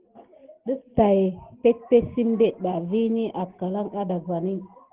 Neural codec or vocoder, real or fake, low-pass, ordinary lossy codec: none; real; 3.6 kHz; Opus, 16 kbps